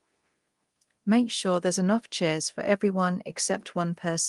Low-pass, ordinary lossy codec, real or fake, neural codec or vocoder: 10.8 kHz; Opus, 24 kbps; fake; codec, 24 kHz, 0.9 kbps, DualCodec